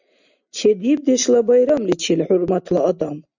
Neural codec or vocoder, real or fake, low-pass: none; real; 7.2 kHz